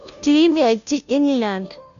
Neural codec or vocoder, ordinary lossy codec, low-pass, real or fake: codec, 16 kHz, 0.5 kbps, FunCodec, trained on Chinese and English, 25 frames a second; none; 7.2 kHz; fake